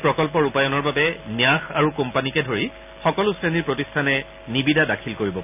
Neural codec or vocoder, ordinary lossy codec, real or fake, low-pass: none; none; real; 3.6 kHz